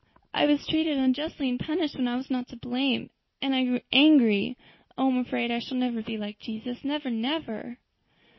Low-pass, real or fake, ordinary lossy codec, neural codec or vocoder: 7.2 kHz; real; MP3, 24 kbps; none